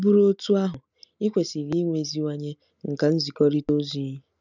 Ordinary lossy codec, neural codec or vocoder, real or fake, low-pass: none; none; real; 7.2 kHz